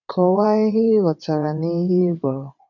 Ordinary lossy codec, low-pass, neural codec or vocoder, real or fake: none; 7.2 kHz; vocoder, 22.05 kHz, 80 mel bands, WaveNeXt; fake